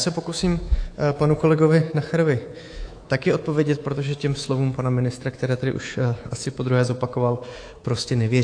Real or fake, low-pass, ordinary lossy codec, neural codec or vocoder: fake; 9.9 kHz; AAC, 48 kbps; codec, 24 kHz, 3.1 kbps, DualCodec